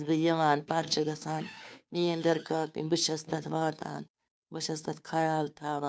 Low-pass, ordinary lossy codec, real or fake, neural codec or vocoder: none; none; fake; codec, 16 kHz, 2 kbps, FunCodec, trained on Chinese and English, 25 frames a second